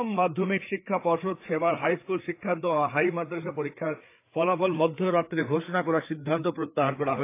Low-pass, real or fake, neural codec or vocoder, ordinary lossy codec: 3.6 kHz; fake; codec, 16 kHz, 4 kbps, FreqCodec, larger model; AAC, 24 kbps